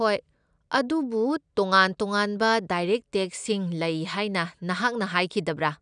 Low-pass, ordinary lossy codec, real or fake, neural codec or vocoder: 9.9 kHz; none; real; none